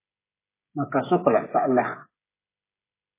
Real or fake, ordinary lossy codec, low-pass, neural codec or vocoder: fake; MP3, 16 kbps; 3.6 kHz; codec, 16 kHz, 16 kbps, FreqCodec, smaller model